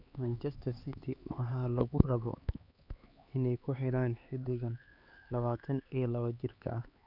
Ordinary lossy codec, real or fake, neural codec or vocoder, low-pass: none; fake; codec, 16 kHz, 4 kbps, X-Codec, HuBERT features, trained on LibriSpeech; 5.4 kHz